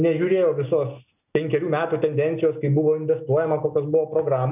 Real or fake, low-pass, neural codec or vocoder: real; 3.6 kHz; none